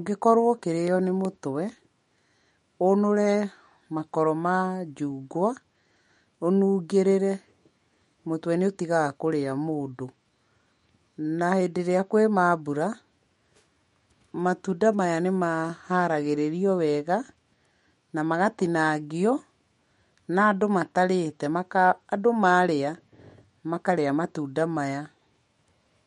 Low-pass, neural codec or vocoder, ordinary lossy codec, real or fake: 19.8 kHz; autoencoder, 48 kHz, 128 numbers a frame, DAC-VAE, trained on Japanese speech; MP3, 48 kbps; fake